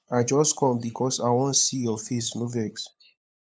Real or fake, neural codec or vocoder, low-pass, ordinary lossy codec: fake; codec, 16 kHz, 8 kbps, FunCodec, trained on LibriTTS, 25 frames a second; none; none